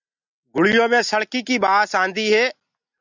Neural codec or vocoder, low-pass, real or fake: none; 7.2 kHz; real